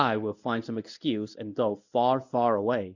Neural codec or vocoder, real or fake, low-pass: codec, 24 kHz, 0.9 kbps, WavTokenizer, medium speech release version 1; fake; 7.2 kHz